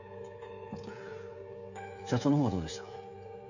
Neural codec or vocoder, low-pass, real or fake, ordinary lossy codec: codec, 16 kHz, 16 kbps, FreqCodec, smaller model; 7.2 kHz; fake; none